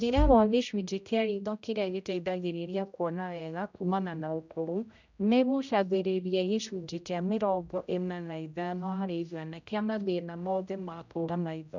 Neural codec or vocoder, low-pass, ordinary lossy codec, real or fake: codec, 16 kHz, 0.5 kbps, X-Codec, HuBERT features, trained on general audio; 7.2 kHz; none; fake